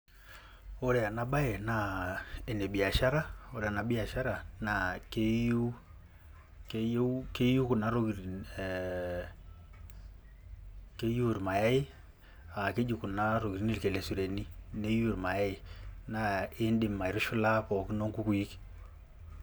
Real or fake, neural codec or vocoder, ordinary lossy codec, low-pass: real; none; none; none